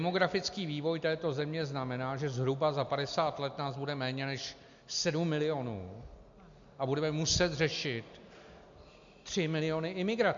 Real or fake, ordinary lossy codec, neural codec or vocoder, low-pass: real; MP3, 64 kbps; none; 7.2 kHz